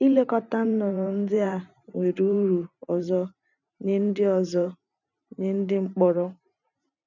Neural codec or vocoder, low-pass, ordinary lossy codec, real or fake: vocoder, 44.1 kHz, 128 mel bands every 512 samples, BigVGAN v2; 7.2 kHz; none; fake